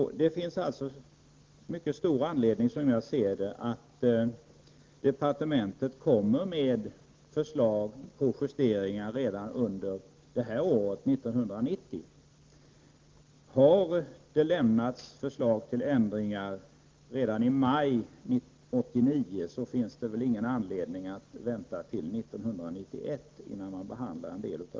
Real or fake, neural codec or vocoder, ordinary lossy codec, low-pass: real; none; Opus, 16 kbps; 7.2 kHz